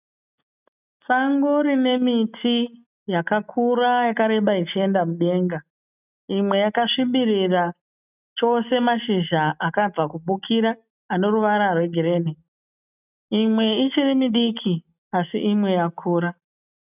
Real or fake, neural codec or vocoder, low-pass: real; none; 3.6 kHz